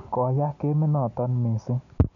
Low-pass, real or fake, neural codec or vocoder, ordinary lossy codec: 7.2 kHz; real; none; none